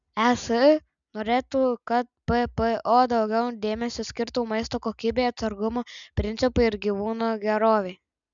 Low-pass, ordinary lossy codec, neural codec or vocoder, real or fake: 7.2 kHz; MP3, 96 kbps; none; real